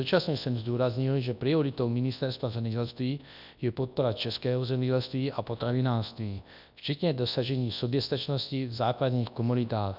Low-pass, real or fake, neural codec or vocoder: 5.4 kHz; fake; codec, 24 kHz, 0.9 kbps, WavTokenizer, large speech release